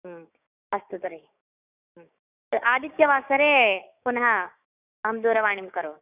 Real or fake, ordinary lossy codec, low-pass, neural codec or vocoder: fake; none; 3.6 kHz; codec, 16 kHz, 6 kbps, DAC